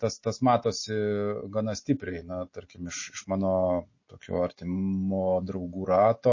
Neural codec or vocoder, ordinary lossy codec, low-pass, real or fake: none; MP3, 32 kbps; 7.2 kHz; real